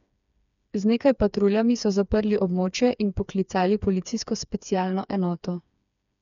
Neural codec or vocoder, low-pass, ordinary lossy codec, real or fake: codec, 16 kHz, 4 kbps, FreqCodec, smaller model; 7.2 kHz; none; fake